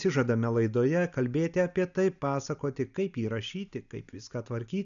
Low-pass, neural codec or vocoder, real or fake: 7.2 kHz; none; real